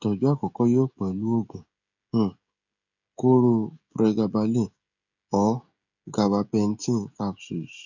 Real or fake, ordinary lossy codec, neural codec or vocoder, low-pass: fake; none; codec, 16 kHz, 16 kbps, FreqCodec, smaller model; 7.2 kHz